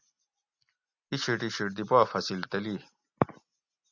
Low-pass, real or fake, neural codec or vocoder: 7.2 kHz; real; none